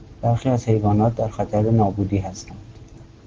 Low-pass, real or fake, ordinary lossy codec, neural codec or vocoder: 7.2 kHz; real; Opus, 16 kbps; none